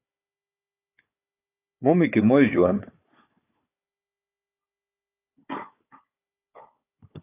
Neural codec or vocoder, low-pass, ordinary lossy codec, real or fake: codec, 16 kHz, 4 kbps, FunCodec, trained on Chinese and English, 50 frames a second; 3.6 kHz; AAC, 24 kbps; fake